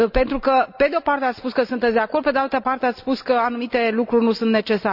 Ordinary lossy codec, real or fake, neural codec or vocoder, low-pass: none; real; none; 5.4 kHz